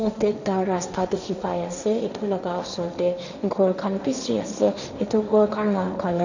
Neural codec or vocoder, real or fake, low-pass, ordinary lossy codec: codec, 16 kHz, 1.1 kbps, Voila-Tokenizer; fake; 7.2 kHz; none